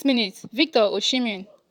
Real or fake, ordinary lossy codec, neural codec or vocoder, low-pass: fake; none; vocoder, 44.1 kHz, 128 mel bands, Pupu-Vocoder; 19.8 kHz